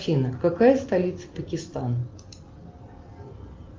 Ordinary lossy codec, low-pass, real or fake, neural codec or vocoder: Opus, 24 kbps; 7.2 kHz; real; none